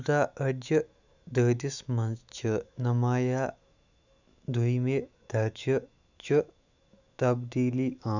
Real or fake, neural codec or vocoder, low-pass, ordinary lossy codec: fake; autoencoder, 48 kHz, 128 numbers a frame, DAC-VAE, trained on Japanese speech; 7.2 kHz; none